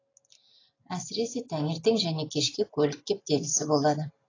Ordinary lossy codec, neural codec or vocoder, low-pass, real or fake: AAC, 32 kbps; none; 7.2 kHz; real